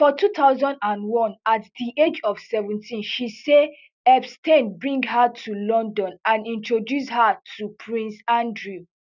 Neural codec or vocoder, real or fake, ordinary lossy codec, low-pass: none; real; none; 7.2 kHz